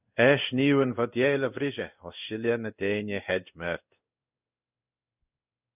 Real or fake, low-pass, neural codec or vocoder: fake; 3.6 kHz; codec, 16 kHz in and 24 kHz out, 1 kbps, XY-Tokenizer